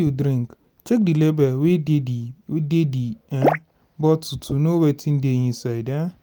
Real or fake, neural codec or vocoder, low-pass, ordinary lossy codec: real; none; none; none